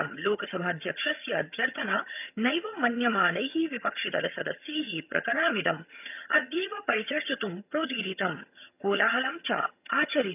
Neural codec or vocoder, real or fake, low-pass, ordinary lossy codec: vocoder, 22.05 kHz, 80 mel bands, HiFi-GAN; fake; 3.6 kHz; none